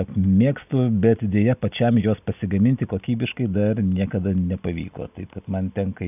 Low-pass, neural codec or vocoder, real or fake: 3.6 kHz; none; real